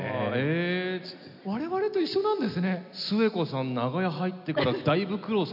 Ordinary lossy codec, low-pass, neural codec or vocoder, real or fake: none; 5.4 kHz; none; real